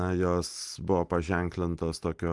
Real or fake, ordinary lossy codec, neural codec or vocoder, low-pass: real; Opus, 24 kbps; none; 10.8 kHz